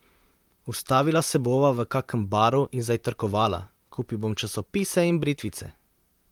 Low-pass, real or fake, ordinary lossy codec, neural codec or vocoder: 19.8 kHz; fake; Opus, 32 kbps; vocoder, 44.1 kHz, 128 mel bands, Pupu-Vocoder